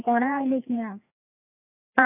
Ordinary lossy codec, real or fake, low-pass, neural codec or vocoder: none; fake; 3.6 kHz; codec, 16 kHz, 2 kbps, FreqCodec, larger model